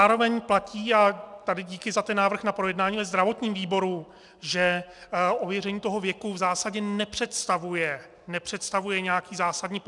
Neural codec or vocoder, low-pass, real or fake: none; 10.8 kHz; real